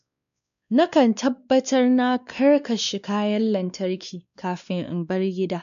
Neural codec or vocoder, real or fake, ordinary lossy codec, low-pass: codec, 16 kHz, 2 kbps, X-Codec, WavLM features, trained on Multilingual LibriSpeech; fake; none; 7.2 kHz